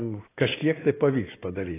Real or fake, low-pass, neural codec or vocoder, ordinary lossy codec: real; 3.6 kHz; none; AAC, 16 kbps